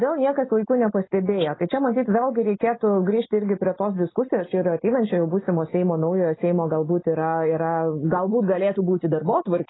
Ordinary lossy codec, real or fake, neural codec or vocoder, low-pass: AAC, 16 kbps; real; none; 7.2 kHz